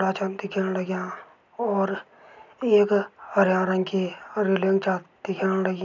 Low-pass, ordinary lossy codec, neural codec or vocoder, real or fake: 7.2 kHz; none; none; real